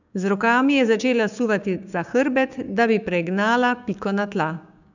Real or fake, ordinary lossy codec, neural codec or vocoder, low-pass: fake; none; codec, 16 kHz, 6 kbps, DAC; 7.2 kHz